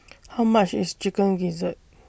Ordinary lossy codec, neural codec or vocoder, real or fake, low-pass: none; none; real; none